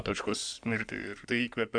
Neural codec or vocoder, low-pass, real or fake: codec, 16 kHz in and 24 kHz out, 2.2 kbps, FireRedTTS-2 codec; 9.9 kHz; fake